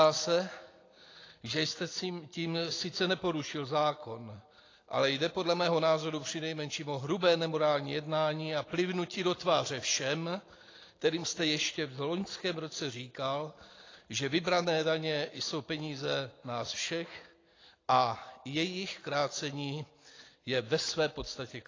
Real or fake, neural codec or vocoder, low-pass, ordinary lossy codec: real; none; 7.2 kHz; AAC, 32 kbps